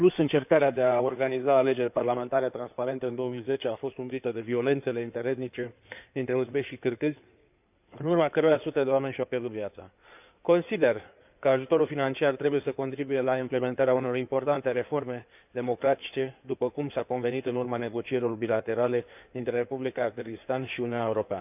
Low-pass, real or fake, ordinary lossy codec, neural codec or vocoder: 3.6 kHz; fake; none; codec, 16 kHz in and 24 kHz out, 2.2 kbps, FireRedTTS-2 codec